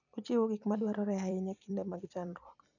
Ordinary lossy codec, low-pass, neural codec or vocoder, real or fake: none; 7.2 kHz; vocoder, 44.1 kHz, 128 mel bands every 256 samples, BigVGAN v2; fake